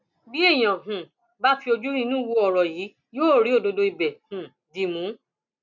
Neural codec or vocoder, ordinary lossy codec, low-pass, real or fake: none; none; 7.2 kHz; real